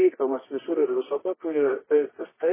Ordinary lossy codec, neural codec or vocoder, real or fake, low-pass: MP3, 16 kbps; codec, 24 kHz, 0.9 kbps, WavTokenizer, medium music audio release; fake; 3.6 kHz